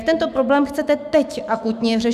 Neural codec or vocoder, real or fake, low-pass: autoencoder, 48 kHz, 128 numbers a frame, DAC-VAE, trained on Japanese speech; fake; 14.4 kHz